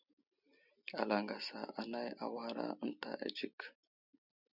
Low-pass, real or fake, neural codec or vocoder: 5.4 kHz; real; none